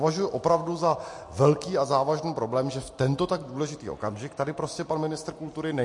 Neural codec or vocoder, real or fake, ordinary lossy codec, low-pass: none; real; MP3, 48 kbps; 10.8 kHz